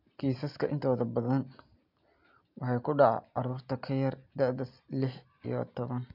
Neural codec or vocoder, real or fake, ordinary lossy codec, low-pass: none; real; MP3, 48 kbps; 5.4 kHz